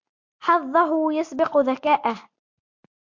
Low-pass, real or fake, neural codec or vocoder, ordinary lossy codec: 7.2 kHz; real; none; MP3, 48 kbps